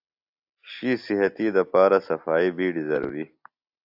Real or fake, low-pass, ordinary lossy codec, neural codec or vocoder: real; 5.4 kHz; AAC, 48 kbps; none